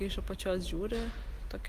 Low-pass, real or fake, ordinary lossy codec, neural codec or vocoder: 14.4 kHz; real; Opus, 24 kbps; none